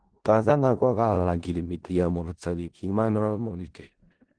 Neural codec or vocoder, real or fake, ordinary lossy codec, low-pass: codec, 16 kHz in and 24 kHz out, 0.4 kbps, LongCat-Audio-Codec, four codebook decoder; fake; Opus, 16 kbps; 9.9 kHz